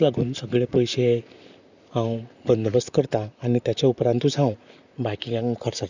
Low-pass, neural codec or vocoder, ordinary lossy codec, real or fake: 7.2 kHz; vocoder, 44.1 kHz, 128 mel bands, Pupu-Vocoder; none; fake